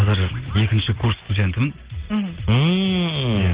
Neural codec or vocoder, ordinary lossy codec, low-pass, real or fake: none; Opus, 16 kbps; 3.6 kHz; real